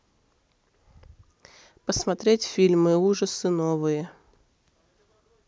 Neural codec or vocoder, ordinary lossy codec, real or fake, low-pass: none; none; real; none